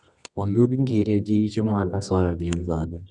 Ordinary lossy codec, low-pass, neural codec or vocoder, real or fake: none; 10.8 kHz; codec, 24 kHz, 0.9 kbps, WavTokenizer, medium music audio release; fake